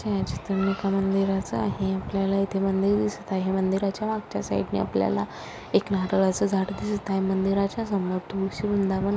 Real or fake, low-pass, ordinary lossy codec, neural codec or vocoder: real; none; none; none